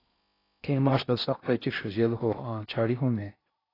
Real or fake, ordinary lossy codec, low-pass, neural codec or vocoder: fake; AAC, 24 kbps; 5.4 kHz; codec, 16 kHz in and 24 kHz out, 0.6 kbps, FocalCodec, streaming, 4096 codes